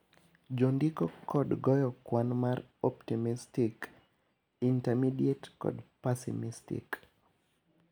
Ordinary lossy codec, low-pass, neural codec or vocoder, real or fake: none; none; none; real